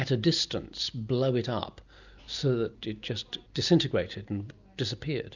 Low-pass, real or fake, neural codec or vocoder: 7.2 kHz; real; none